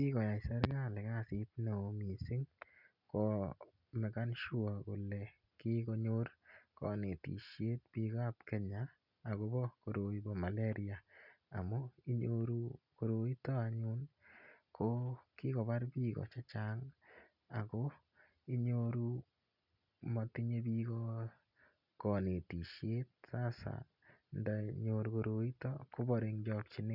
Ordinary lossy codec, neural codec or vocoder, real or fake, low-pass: none; none; real; 5.4 kHz